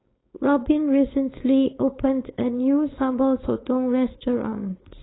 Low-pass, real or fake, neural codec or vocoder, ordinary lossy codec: 7.2 kHz; fake; codec, 16 kHz, 4.8 kbps, FACodec; AAC, 16 kbps